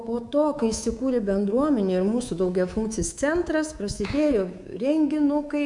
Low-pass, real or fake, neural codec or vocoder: 10.8 kHz; fake; codec, 24 kHz, 3.1 kbps, DualCodec